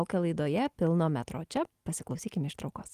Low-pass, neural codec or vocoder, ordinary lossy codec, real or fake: 14.4 kHz; none; Opus, 24 kbps; real